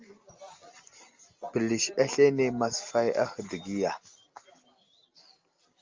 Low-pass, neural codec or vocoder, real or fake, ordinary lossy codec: 7.2 kHz; none; real; Opus, 32 kbps